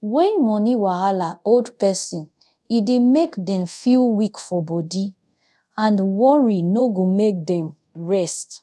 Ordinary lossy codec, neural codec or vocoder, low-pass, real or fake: none; codec, 24 kHz, 0.5 kbps, DualCodec; none; fake